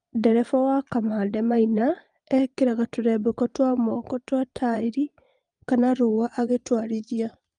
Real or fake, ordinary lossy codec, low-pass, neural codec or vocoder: fake; Opus, 32 kbps; 9.9 kHz; vocoder, 22.05 kHz, 80 mel bands, Vocos